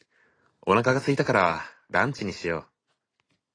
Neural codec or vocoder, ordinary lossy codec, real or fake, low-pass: none; AAC, 32 kbps; real; 9.9 kHz